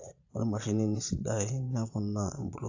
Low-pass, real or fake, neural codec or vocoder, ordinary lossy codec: 7.2 kHz; fake; vocoder, 22.05 kHz, 80 mel bands, Vocos; AAC, 48 kbps